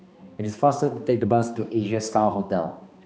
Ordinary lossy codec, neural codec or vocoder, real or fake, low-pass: none; codec, 16 kHz, 2 kbps, X-Codec, HuBERT features, trained on balanced general audio; fake; none